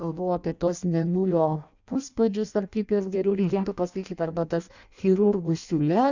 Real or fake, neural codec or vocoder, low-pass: fake; codec, 16 kHz in and 24 kHz out, 0.6 kbps, FireRedTTS-2 codec; 7.2 kHz